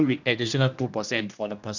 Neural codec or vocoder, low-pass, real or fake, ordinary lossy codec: codec, 16 kHz, 1 kbps, X-Codec, HuBERT features, trained on general audio; 7.2 kHz; fake; none